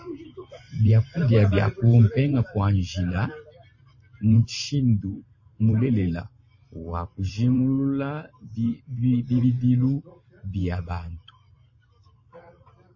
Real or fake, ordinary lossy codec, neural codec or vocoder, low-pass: fake; MP3, 32 kbps; vocoder, 44.1 kHz, 128 mel bands every 512 samples, BigVGAN v2; 7.2 kHz